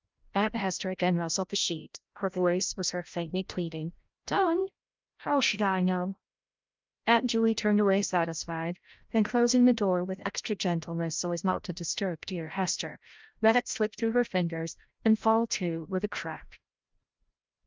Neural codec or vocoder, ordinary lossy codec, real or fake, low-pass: codec, 16 kHz, 0.5 kbps, FreqCodec, larger model; Opus, 24 kbps; fake; 7.2 kHz